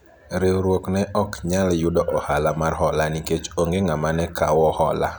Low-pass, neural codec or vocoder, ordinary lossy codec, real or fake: none; none; none; real